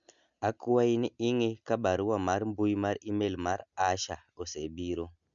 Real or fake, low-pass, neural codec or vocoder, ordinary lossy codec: real; 7.2 kHz; none; none